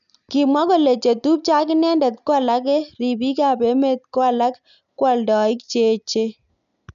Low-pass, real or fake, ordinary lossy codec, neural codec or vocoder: 7.2 kHz; real; none; none